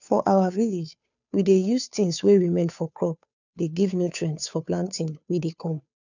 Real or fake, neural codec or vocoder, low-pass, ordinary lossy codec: fake; codec, 16 kHz, 2 kbps, FunCodec, trained on Chinese and English, 25 frames a second; 7.2 kHz; none